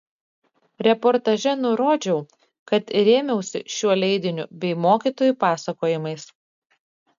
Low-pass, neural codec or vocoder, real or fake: 7.2 kHz; none; real